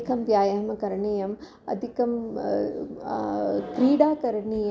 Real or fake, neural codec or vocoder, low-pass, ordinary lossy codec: real; none; none; none